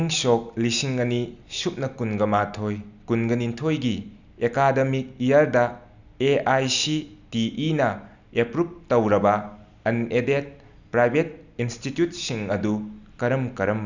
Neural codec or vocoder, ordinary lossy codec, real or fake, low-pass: none; none; real; 7.2 kHz